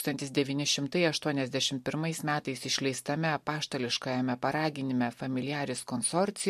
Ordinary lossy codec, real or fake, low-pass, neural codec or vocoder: MP3, 64 kbps; real; 14.4 kHz; none